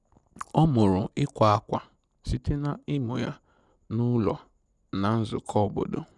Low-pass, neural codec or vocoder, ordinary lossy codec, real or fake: 10.8 kHz; none; none; real